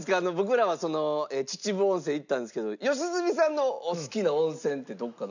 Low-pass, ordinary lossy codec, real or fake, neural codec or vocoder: 7.2 kHz; none; real; none